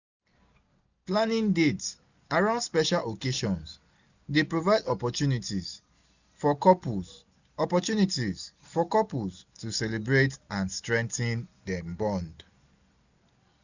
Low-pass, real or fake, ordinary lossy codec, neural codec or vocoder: 7.2 kHz; real; none; none